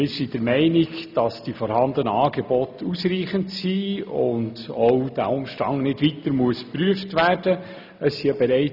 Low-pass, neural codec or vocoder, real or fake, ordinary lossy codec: 5.4 kHz; none; real; none